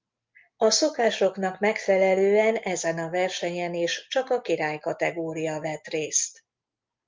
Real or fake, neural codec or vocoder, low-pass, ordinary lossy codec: real; none; 7.2 kHz; Opus, 32 kbps